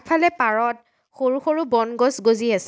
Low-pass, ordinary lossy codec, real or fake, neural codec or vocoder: none; none; real; none